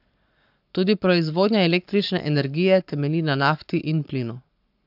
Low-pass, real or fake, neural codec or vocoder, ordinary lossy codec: 5.4 kHz; fake; codec, 44.1 kHz, 7.8 kbps, Pupu-Codec; AAC, 48 kbps